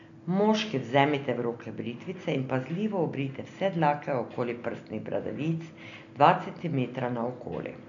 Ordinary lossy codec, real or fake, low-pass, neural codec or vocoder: none; real; 7.2 kHz; none